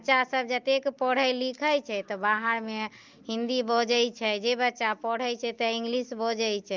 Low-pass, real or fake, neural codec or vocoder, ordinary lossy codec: 7.2 kHz; real; none; Opus, 32 kbps